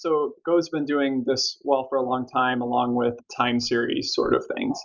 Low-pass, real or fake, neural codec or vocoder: 7.2 kHz; real; none